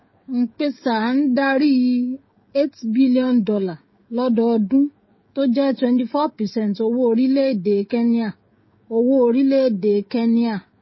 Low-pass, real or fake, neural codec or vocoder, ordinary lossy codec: 7.2 kHz; fake; codec, 16 kHz, 16 kbps, FreqCodec, smaller model; MP3, 24 kbps